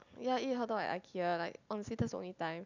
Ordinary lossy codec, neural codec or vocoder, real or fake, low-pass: none; none; real; 7.2 kHz